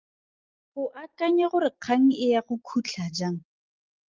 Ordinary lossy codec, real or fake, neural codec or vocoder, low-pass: Opus, 32 kbps; real; none; 7.2 kHz